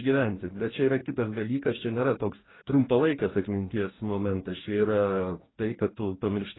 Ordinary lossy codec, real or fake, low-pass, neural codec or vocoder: AAC, 16 kbps; fake; 7.2 kHz; codec, 44.1 kHz, 2.6 kbps, DAC